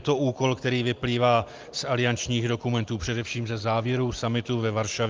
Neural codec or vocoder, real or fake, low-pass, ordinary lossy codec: none; real; 7.2 kHz; Opus, 32 kbps